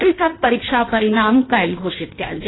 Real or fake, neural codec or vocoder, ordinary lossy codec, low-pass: fake; codec, 16 kHz in and 24 kHz out, 1.1 kbps, FireRedTTS-2 codec; AAC, 16 kbps; 7.2 kHz